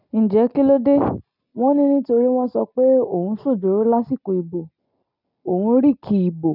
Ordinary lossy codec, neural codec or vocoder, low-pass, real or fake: none; none; 5.4 kHz; real